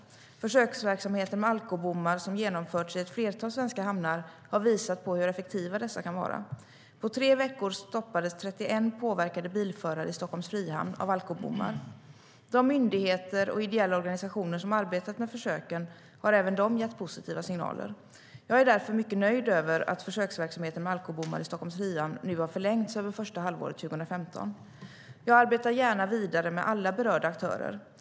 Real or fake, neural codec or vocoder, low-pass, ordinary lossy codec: real; none; none; none